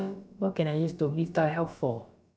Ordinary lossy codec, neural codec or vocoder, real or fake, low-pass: none; codec, 16 kHz, about 1 kbps, DyCAST, with the encoder's durations; fake; none